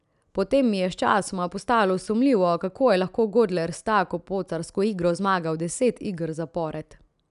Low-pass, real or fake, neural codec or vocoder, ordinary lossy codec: 10.8 kHz; real; none; none